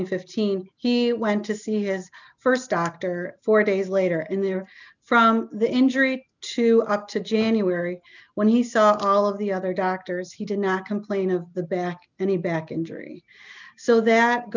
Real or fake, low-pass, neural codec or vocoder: real; 7.2 kHz; none